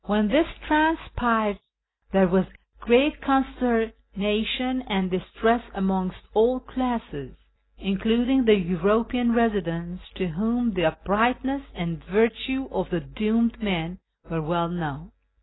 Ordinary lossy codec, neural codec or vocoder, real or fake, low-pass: AAC, 16 kbps; none; real; 7.2 kHz